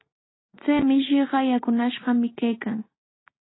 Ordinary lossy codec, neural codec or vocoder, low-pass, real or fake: AAC, 16 kbps; none; 7.2 kHz; real